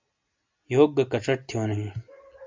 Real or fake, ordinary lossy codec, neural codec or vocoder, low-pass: real; MP3, 64 kbps; none; 7.2 kHz